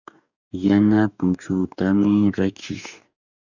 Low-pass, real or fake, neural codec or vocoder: 7.2 kHz; fake; codec, 44.1 kHz, 2.6 kbps, DAC